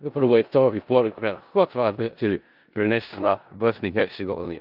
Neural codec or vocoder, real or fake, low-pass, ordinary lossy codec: codec, 16 kHz in and 24 kHz out, 0.4 kbps, LongCat-Audio-Codec, four codebook decoder; fake; 5.4 kHz; Opus, 24 kbps